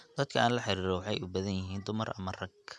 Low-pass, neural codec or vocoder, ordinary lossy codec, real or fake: none; none; none; real